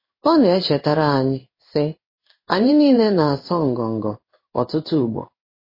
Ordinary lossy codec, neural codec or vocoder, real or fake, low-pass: MP3, 24 kbps; codec, 16 kHz in and 24 kHz out, 1 kbps, XY-Tokenizer; fake; 5.4 kHz